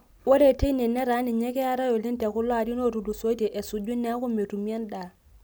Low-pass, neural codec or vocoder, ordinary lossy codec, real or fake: none; none; none; real